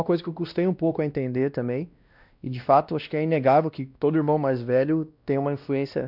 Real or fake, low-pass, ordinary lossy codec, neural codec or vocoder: fake; 5.4 kHz; none; codec, 16 kHz, 1 kbps, X-Codec, WavLM features, trained on Multilingual LibriSpeech